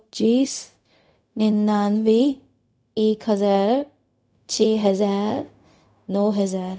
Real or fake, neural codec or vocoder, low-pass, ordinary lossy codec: fake; codec, 16 kHz, 0.4 kbps, LongCat-Audio-Codec; none; none